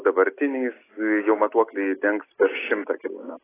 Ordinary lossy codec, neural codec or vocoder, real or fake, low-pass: AAC, 16 kbps; none; real; 3.6 kHz